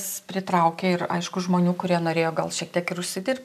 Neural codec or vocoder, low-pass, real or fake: none; 14.4 kHz; real